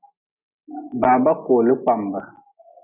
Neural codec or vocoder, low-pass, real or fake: none; 3.6 kHz; real